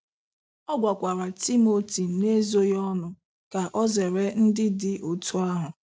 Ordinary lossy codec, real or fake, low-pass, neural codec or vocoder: none; real; none; none